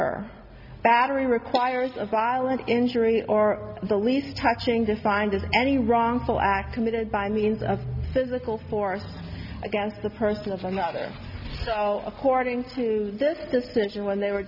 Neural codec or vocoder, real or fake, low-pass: none; real; 5.4 kHz